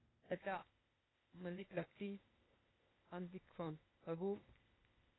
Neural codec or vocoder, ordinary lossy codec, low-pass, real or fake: codec, 16 kHz, 0.8 kbps, ZipCodec; AAC, 16 kbps; 7.2 kHz; fake